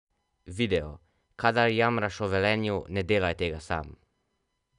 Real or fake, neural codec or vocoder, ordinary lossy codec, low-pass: real; none; none; 10.8 kHz